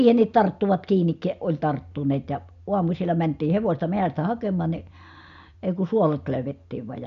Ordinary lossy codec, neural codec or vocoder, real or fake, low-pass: none; none; real; 7.2 kHz